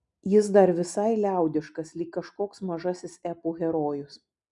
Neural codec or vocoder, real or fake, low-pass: none; real; 10.8 kHz